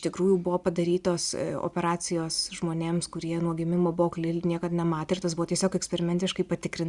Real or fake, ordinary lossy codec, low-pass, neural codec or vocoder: real; Opus, 64 kbps; 10.8 kHz; none